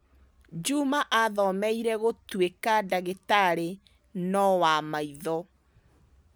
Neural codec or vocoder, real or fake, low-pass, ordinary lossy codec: none; real; none; none